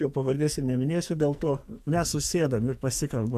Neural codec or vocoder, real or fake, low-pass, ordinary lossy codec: codec, 44.1 kHz, 2.6 kbps, SNAC; fake; 14.4 kHz; AAC, 96 kbps